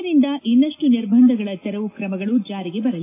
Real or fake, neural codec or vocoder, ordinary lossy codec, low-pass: real; none; AAC, 24 kbps; 3.6 kHz